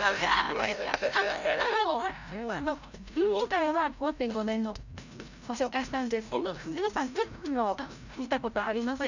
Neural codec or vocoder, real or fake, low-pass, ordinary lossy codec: codec, 16 kHz, 0.5 kbps, FreqCodec, larger model; fake; 7.2 kHz; none